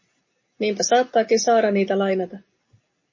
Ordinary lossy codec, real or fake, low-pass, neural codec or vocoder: MP3, 32 kbps; real; 7.2 kHz; none